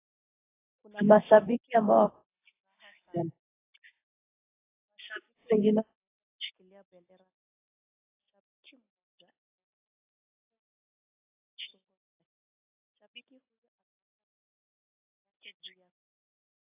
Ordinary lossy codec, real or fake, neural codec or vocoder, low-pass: AAC, 24 kbps; real; none; 3.6 kHz